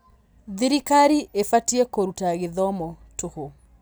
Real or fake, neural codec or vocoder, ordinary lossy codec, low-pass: real; none; none; none